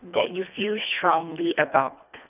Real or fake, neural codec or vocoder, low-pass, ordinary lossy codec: fake; codec, 24 kHz, 1.5 kbps, HILCodec; 3.6 kHz; none